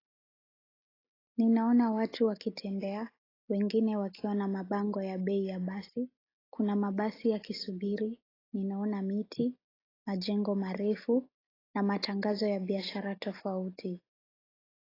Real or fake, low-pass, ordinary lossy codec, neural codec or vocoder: real; 5.4 kHz; AAC, 24 kbps; none